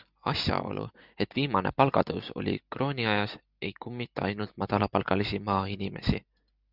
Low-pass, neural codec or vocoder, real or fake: 5.4 kHz; none; real